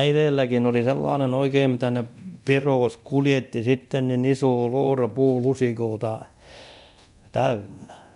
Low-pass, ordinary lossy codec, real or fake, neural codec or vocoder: 10.8 kHz; none; fake; codec, 24 kHz, 0.9 kbps, DualCodec